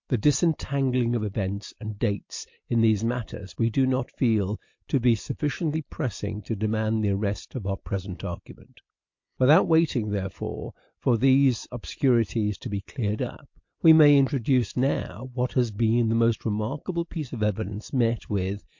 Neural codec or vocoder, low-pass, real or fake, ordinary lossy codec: none; 7.2 kHz; real; MP3, 48 kbps